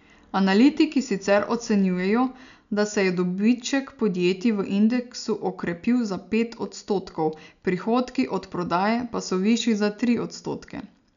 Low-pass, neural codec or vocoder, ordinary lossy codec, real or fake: 7.2 kHz; none; none; real